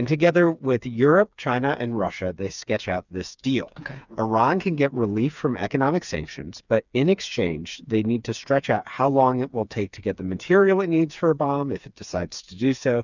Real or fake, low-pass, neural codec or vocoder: fake; 7.2 kHz; codec, 16 kHz, 4 kbps, FreqCodec, smaller model